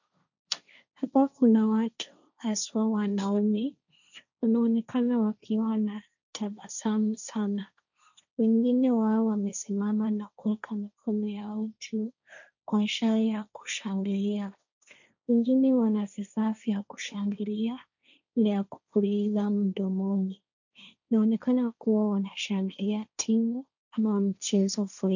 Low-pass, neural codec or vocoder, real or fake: 7.2 kHz; codec, 16 kHz, 1.1 kbps, Voila-Tokenizer; fake